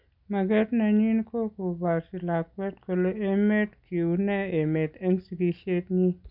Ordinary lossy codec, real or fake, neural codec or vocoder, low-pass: none; real; none; 5.4 kHz